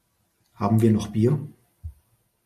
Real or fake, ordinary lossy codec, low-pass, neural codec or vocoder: real; MP3, 64 kbps; 14.4 kHz; none